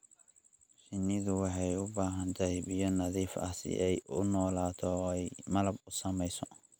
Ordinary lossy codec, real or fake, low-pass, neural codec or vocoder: none; real; none; none